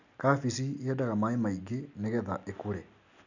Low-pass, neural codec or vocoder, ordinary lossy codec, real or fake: 7.2 kHz; none; none; real